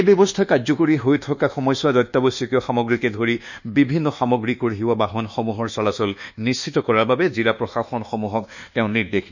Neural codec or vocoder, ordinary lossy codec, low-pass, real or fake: codec, 24 kHz, 1.2 kbps, DualCodec; none; 7.2 kHz; fake